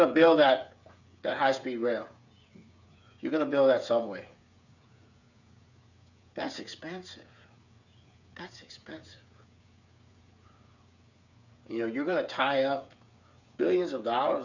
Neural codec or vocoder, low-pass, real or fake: codec, 16 kHz, 8 kbps, FreqCodec, smaller model; 7.2 kHz; fake